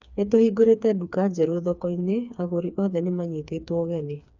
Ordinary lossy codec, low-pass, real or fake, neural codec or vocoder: none; 7.2 kHz; fake; codec, 16 kHz, 4 kbps, FreqCodec, smaller model